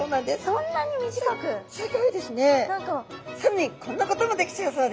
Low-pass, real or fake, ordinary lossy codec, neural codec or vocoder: none; real; none; none